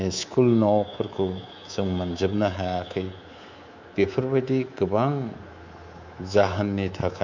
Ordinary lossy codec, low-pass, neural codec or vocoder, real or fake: MP3, 64 kbps; 7.2 kHz; codec, 24 kHz, 3.1 kbps, DualCodec; fake